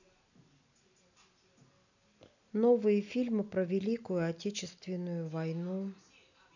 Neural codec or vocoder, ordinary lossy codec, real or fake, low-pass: none; none; real; 7.2 kHz